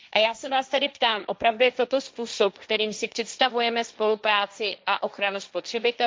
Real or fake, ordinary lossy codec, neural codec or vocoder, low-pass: fake; none; codec, 16 kHz, 1.1 kbps, Voila-Tokenizer; none